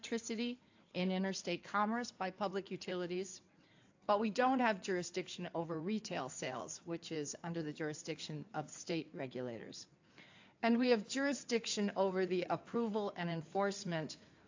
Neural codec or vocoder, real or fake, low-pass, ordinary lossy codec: codec, 16 kHz in and 24 kHz out, 2.2 kbps, FireRedTTS-2 codec; fake; 7.2 kHz; AAC, 48 kbps